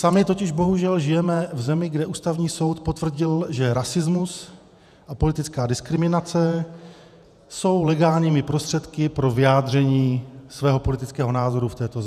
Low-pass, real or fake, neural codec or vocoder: 14.4 kHz; fake; vocoder, 48 kHz, 128 mel bands, Vocos